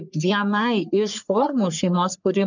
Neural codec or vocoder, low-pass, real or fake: codec, 44.1 kHz, 3.4 kbps, Pupu-Codec; 7.2 kHz; fake